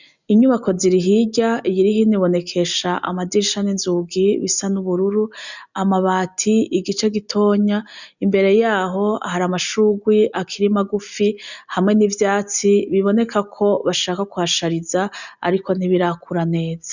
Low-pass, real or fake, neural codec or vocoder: 7.2 kHz; real; none